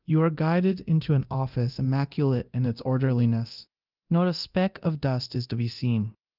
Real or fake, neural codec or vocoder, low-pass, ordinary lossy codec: fake; codec, 24 kHz, 0.9 kbps, DualCodec; 5.4 kHz; Opus, 32 kbps